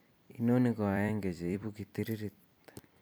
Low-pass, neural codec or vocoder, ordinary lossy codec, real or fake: 19.8 kHz; vocoder, 44.1 kHz, 128 mel bands every 256 samples, BigVGAN v2; none; fake